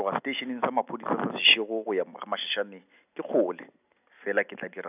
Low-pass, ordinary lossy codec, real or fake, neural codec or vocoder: 3.6 kHz; none; real; none